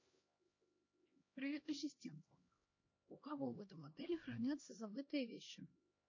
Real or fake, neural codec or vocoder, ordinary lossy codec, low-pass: fake; codec, 16 kHz, 1 kbps, X-Codec, HuBERT features, trained on LibriSpeech; MP3, 32 kbps; 7.2 kHz